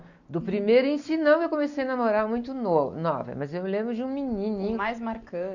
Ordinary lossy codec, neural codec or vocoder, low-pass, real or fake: none; none; 7.2 kHz; real